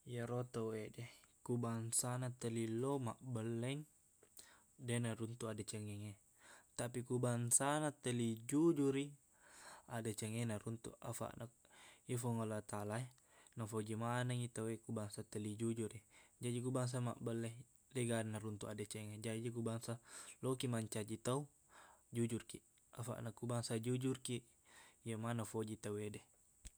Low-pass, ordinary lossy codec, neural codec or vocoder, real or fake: none; none; none; real